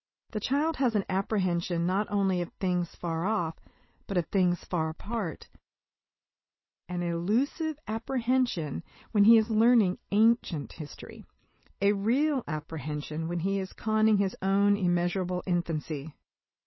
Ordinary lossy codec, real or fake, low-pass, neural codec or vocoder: MP3, 24 kbps; real; 7.2 kHz; none